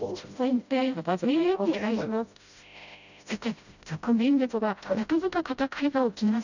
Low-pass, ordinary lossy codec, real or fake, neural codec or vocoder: 7.2 kHz; none; fake; codec, 16 kHz, 0.5 kbps, FreqCodec, smaller model